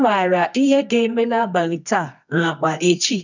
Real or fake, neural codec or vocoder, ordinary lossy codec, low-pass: fake; codec, 24 kHz, 0.9 kbps, WavTokenizer, medium music audio release; none; 7.2 kHz